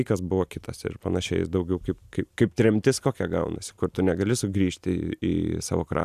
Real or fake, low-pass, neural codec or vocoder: real; 14.4 kHz; none